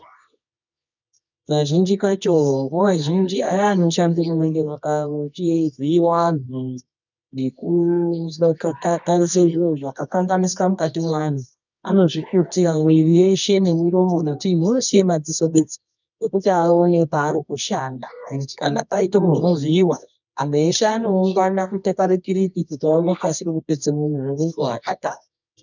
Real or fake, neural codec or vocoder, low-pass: fake; codec, 24 kHz, 0.9 kbps, WavTokenizer, medium music audio release; 7.2 kHz